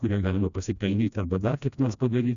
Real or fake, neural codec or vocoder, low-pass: fake; codec, 16 kHz, 1 kbps, FreqCodec, smaller model; 7.2 kHz